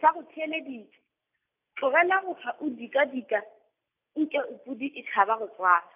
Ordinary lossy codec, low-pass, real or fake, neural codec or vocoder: AAC, 32 kbps; 3.6 kHz; real; none